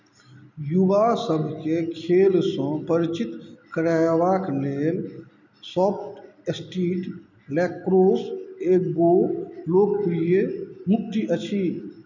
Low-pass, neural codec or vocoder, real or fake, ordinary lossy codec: 7.2 kHz; none; real; none